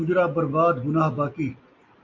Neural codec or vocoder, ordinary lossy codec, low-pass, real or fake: none; MP3, 48 kbps; 7.2 kHz; real